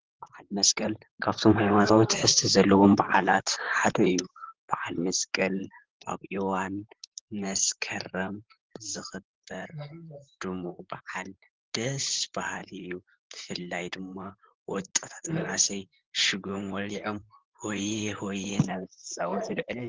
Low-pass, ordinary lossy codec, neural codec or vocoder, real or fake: 7.2 kHz; Opus, 16 kbps; vocoder, 44.1 kHz, 128 mel bands, Pupu-Vocoder; fake